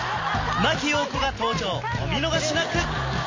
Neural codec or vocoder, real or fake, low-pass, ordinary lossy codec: none; real; 7.2 kHz; MP3, 32 kbps